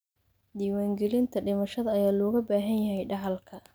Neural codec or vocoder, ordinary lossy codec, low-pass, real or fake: none; none; none; real